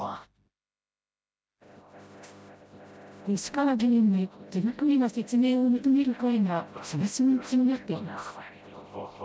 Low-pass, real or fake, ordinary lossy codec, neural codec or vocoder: none; fake; none; codec, 16 kHz, 0.5 kbps, FreqCodec, smaller model